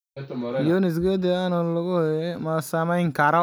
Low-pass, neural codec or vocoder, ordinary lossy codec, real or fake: none; none; none; real